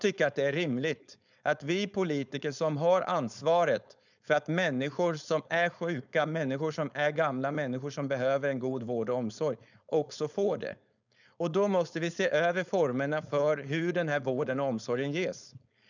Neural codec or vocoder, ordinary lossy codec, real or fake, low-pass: codec, 16 kHz, 4.8 kbps, FACodec; none; fake; 7.2 kHz